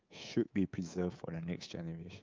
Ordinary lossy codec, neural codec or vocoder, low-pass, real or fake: Opus, 24 kbps; codec, 24 kHz, 3.1 kbps, DualCodec; 7.2 kHz; fake